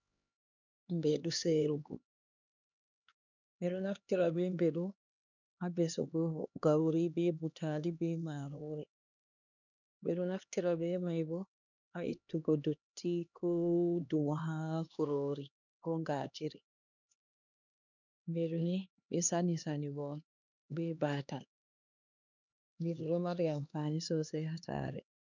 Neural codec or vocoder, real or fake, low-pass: codec, 16 kHz, 2 kbps, X-Codec, HuBERT features, trained on LibriSpeech; fake; 7.2 kHz